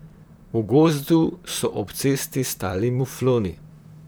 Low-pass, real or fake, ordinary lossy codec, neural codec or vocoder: none; fake; none; vocoder, 44.1 kHz, 128 mel bands, Pupu-Vocoder